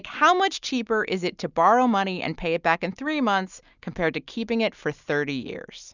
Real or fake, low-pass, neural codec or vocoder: real; 7.2 kHz; none